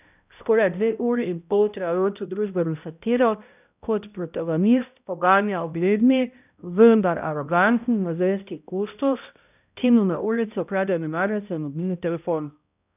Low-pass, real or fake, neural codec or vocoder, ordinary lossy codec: 3.6 kHz; fake; codec, 16 kHz, 0.5 kbps, X-Codec, HuBERT features, trained on balanced general audio; none